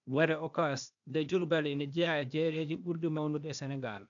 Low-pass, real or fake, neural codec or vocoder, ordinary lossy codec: 7.2 kHz; fake; codec, 16 kHz, 0.8 kbps, ZipCodec; none